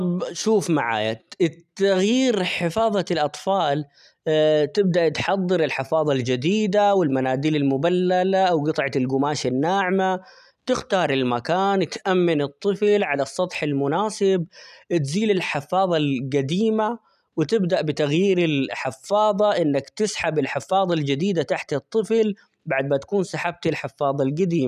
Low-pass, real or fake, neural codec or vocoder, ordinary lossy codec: 14.4 kHz; real; none; none